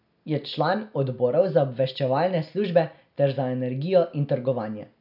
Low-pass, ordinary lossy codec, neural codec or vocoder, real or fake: 5.4 kHz; none; none; real